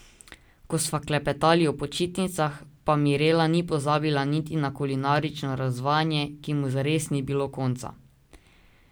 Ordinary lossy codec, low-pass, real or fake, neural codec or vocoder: none; none; real; none